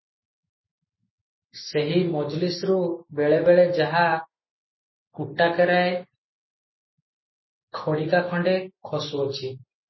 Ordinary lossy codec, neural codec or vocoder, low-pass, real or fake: MP3, 24 kbps; none; 7.2 kHz; real